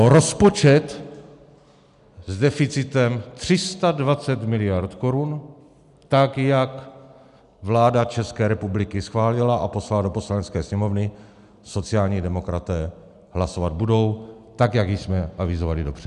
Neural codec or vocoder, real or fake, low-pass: none; real; 10.8 kHz